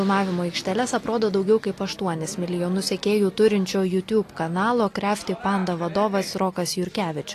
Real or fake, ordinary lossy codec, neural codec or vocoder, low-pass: real; AAC, 48 kbps; none; 14.4 kHz